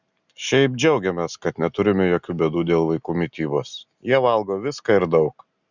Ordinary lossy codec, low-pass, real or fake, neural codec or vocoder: Opus, 64 kbps; 7.2 kHz; real; none